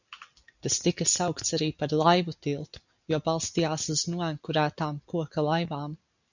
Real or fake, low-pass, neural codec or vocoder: real; 7.2 kHz; none